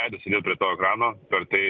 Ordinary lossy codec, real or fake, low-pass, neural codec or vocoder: Opus, 24 kbps; real; 7.2 kHz; none